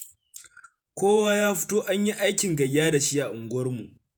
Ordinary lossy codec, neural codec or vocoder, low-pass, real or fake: none; vocoder, 48 kHz, 128 mel bands, Vocos; none; fake